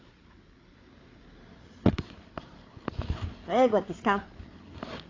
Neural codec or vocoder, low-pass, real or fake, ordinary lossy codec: codec, 16 kHz, 8 kbps, FreqCodec, larger model; 7.2 kHz; fake; AAC, 32 kbps